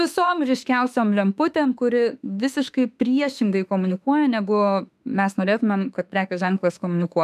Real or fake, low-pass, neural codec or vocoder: fake; 14.4 kHz; autoencoder, 48 kHz, 32 numbers a frame, DAC-VAE, trained on Japanese speech